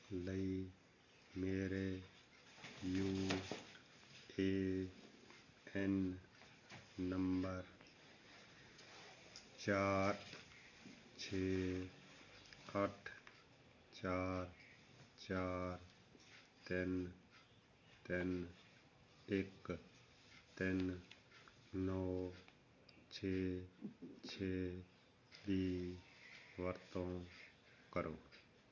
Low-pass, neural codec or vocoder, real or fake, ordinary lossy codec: 7.2 kHz; none; real; none